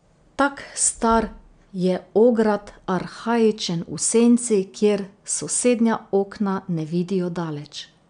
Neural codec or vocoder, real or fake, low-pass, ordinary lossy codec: none; real; 9.9 kHz; none